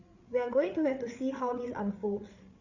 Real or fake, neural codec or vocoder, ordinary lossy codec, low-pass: fake; codec, 16 kHz, 8 kbps, FreqCodec, larger model; Opus, 64 kbps; 7.2 kHz